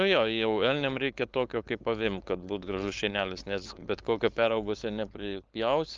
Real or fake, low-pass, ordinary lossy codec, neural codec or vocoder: fake; 7.2 kHz; Opus, 24 kbps; codec, 16 kHz, 4.8 kbps, FACodec